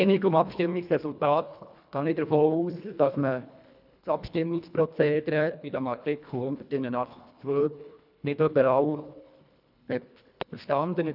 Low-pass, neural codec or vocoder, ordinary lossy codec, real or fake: 5.4 kHz; codec, 24 kHz, 1.5 kbps, HILCodec; none; fake